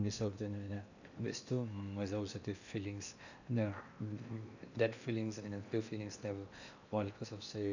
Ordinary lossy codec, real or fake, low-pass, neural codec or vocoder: none; fake; 7.2 kHz; codec, 16 kHz in and 24 kHz out, 0.8 kbps, FocalCodec, streaming, 65536 codes